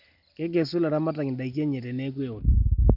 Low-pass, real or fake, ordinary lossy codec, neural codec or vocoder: 5.4 kHz; real; none; none